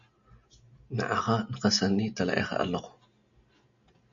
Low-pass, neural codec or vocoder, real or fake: 7.2 kHz; none; real